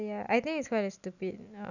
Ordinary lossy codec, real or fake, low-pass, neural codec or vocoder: none; real; 7.2 kHz; none